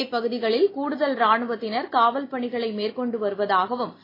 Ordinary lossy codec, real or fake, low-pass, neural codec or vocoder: AAC, 24 kbps; real; 5.4 kHz; none